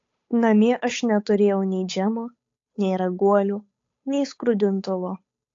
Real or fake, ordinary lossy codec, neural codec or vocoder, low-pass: fake; AAC, 48 kbps; codec, 16 kHz, 8 kbps, FunCodec, trained on Chinese and English, 25 frames a second; 7.2 kHz